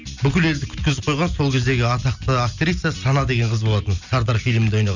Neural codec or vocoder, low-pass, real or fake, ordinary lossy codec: none; 7.2 kHz; real; none